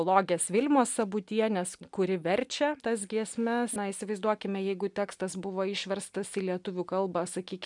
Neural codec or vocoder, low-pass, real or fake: none; 10.8 kHz; real